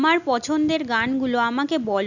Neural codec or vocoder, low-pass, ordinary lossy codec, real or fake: none; 7.2 kHz; none; real